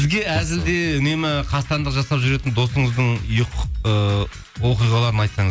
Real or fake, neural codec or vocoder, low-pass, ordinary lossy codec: real; none; none; none